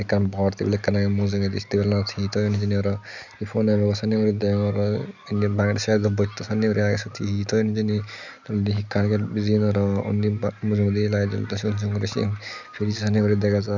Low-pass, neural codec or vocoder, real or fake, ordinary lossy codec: 7.2 kHz; none; real; none